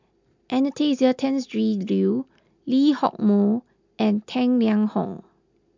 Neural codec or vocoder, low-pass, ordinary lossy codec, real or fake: none; 7.2 kHz; MP3, 64 kbps; real